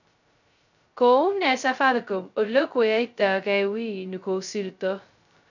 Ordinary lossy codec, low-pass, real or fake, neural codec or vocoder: none; 7.2 kHz; fake; codec, 16 kHz, 0.2 kbps, FocalCodec